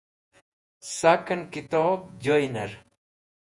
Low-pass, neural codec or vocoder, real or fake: 10.8 kHz; vocoder, 48 kHz, 128 mel bands, Vocos; fake